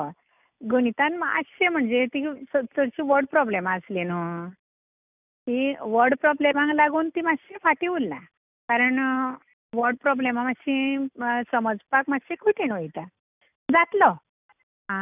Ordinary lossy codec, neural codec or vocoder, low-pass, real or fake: none; none; 3.6 kHz; real